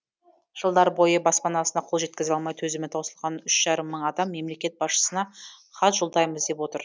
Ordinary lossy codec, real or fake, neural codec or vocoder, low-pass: none; real; none; none